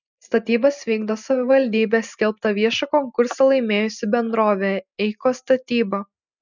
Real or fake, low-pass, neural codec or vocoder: real; 7.2 kHz; none